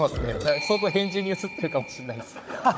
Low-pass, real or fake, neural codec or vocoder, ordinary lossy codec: none; fake; codec, 16 kHz, 16 kbps, FunCodec, trained on Chinese and English, 50 frames a second; none